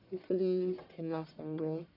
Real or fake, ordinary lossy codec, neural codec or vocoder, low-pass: fake; none; codec, 44.1 kHz, 1.7 kbps, Pupu-Codec; 5.4 kHz